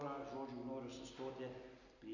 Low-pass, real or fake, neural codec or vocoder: 7.2 kHz; real; none